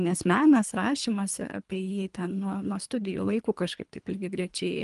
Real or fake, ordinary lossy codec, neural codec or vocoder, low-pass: fake; Opus, 32 kbps; codec, 24 kHz, 3 kbps, HILCodec; 10.8 kHz